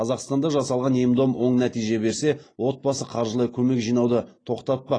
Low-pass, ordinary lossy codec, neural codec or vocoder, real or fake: 9.9 kHz; AAC, 32 kbps; none; real